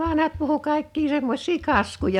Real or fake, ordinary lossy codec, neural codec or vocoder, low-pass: real; none; none; 19.8 kHz